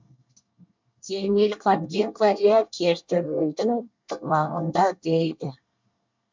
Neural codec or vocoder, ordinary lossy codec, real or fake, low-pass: codec, 24 kHz, 1 kbps, SNAC; MP3, 64 kbps; fake; 7.2 kHz